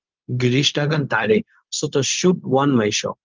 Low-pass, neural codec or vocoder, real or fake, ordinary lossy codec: 7.2 kHz; codec, 16 kHz, 0.4 kbps, LongCat-Audio-Codec; fake; Opus, 24 kbps